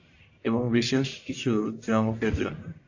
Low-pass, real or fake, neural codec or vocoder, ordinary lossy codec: 7.2 kHz; fake; codec, 44.1 kHz, 1.7 kbps, Pupu-Codec; AAC, 48 kbps